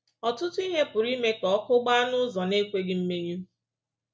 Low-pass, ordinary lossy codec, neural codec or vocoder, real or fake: none; none; none; real